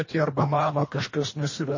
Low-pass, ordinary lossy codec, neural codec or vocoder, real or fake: 7.2 kHz; MP3, 32 kbps; codec, 24 kHz, 1.5 kbps, HILCodec; fake